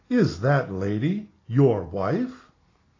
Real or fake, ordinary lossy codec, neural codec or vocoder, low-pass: real; AAC, 32 kbps; none; 7.2 kHz